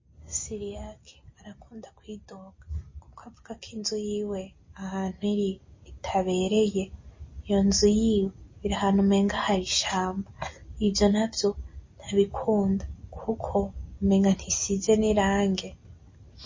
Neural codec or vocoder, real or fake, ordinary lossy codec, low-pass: none; real; MP3, 32 kbps; 7.2 kHz